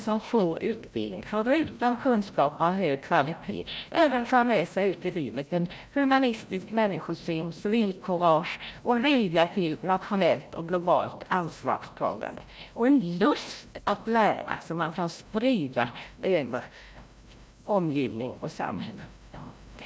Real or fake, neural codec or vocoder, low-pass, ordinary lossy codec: fake; codec, 16 kHz, 0.5 kbps, FreqCodec, larger model; none; none